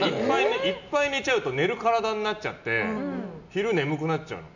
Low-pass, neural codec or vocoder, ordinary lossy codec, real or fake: 7.2 kHz; none; none; real